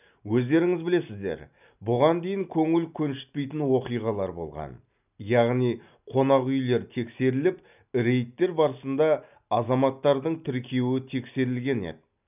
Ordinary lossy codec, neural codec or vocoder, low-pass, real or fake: none; none; 3.6 kHz; real